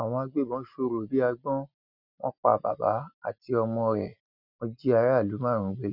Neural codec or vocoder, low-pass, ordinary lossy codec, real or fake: none; 5.4 kHz; none; real